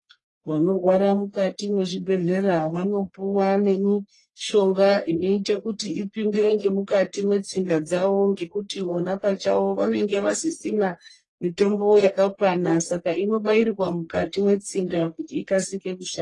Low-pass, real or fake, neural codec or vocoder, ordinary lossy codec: 10.8 kHz; fake; codec, 44.1 kHz, 1.7 kbps, Pupu-Codec; AAC, 32 kbps